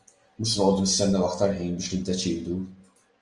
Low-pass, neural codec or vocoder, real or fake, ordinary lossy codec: 10.8 kHz; none; real; Opus, 32 kbps